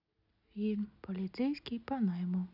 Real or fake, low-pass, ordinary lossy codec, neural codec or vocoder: real; 5.4 kHz; none; none